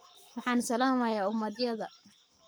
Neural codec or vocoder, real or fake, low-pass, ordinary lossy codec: codec, 44.1 kHz, 7.8 kbps, Pupu-Codec; fake; none; none